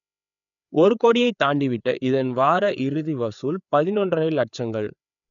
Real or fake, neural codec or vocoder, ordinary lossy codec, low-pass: fake; codec, 16 kHz, 4 kbps, FreqCodec, larger model; none; 7.2 kHz